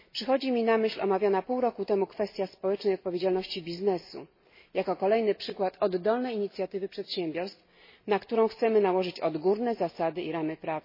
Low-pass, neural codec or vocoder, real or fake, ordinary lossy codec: 5.4 kHz; none; real; MP3, 24 kbps